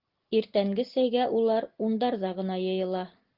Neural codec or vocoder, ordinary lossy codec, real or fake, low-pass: none; Opus, 16 kbps; real; 5.4 kHz